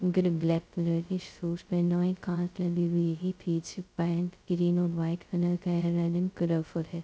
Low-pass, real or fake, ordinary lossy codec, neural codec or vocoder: none; fake; none; codec, 16 kHz, 0.2 kbps, FocalCodec